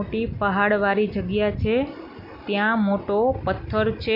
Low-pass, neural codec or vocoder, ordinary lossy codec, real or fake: 5.4 kHz; none; none; real